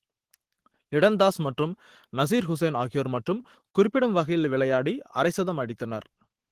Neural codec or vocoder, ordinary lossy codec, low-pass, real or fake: codec, 44.1 kHz, 7.8 kbps, Pupu-Codec; Opus, 16 kbps; 14.4 kHz; fake